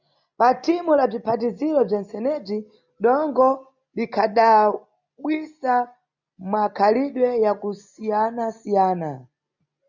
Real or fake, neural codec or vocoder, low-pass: real; none; 7.2 kHz